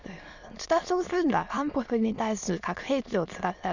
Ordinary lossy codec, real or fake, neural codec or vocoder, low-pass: none; fake; autoencoder, 22.05 kHz, a latent of 192 numbers a frame, VITS, trained on many speakers; 7.2 kHz